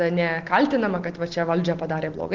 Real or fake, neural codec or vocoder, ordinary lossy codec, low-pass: real; none; Opus, 16 kbps; 7.2 kHz